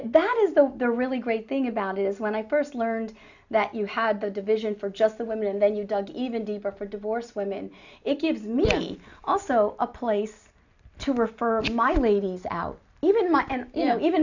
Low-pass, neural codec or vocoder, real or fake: 7.2 kHz; none; real